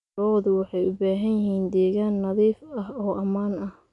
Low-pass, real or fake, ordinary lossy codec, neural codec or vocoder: 10.8 kHz; real; none; none